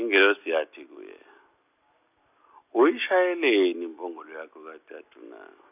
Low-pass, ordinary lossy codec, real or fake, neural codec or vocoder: 3.6 kHz; none; real; none